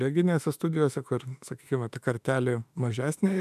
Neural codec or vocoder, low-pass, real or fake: autoencoder, 48 kHz, 32 numbers a frame, DAC-VAE, trained on Japanese speech; 14.4 kHz; fake